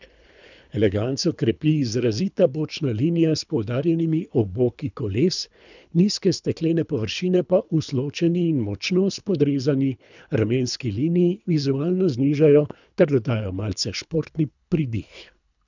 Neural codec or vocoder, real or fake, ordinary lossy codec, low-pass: codec, 24 kHz, 3 kbps, HILCodec; fake; none; 7.2 kHz